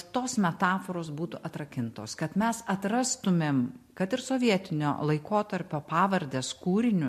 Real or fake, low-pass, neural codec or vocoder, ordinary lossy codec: real; 14.4 kHz; none; MP3, 64 kbps